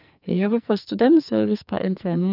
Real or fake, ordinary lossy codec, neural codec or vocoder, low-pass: fake; none; codec, 44.1 kHz, 2.6 kbps, SNAC; 5.4 kHz